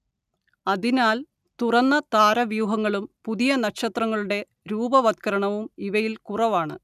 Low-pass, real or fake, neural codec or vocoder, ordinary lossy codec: 14.4 kHz; real; none; none